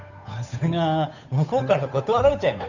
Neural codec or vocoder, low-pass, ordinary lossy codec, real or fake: codec, 16 kHz, 8 kbps, FunCodec, trained on Chinese and English, 25 frames a second; 7.2 kHz; none; fake